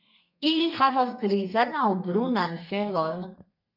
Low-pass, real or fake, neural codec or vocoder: 5.4 kHz; fake; codec, 32 kHz, 1.9 kbps, SNAC